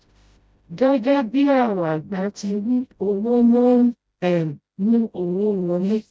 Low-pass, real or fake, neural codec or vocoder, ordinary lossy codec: none; fake; codec, 16 kHz, 0.5 kbps, FreqCodec, smaller model; none